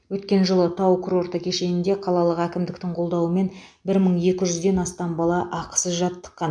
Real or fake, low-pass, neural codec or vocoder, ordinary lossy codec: real; 9.9 kHz; none; MP3, 64 kbps